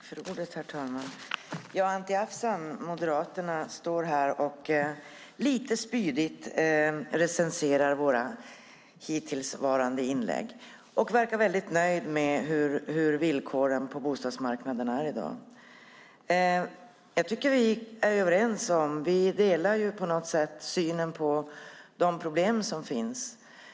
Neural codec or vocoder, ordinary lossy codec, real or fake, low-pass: none; none; real; none